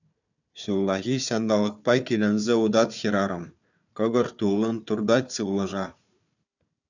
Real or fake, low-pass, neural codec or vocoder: fake; 7.2 kHz; codec, 16 kHz, 4 kbps, FunCodec, trained on Chinese and English, 50 frames a second